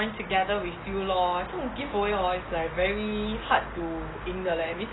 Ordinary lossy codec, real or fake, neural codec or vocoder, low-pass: AAC, 16 kbps; real; none; 7.2 kHz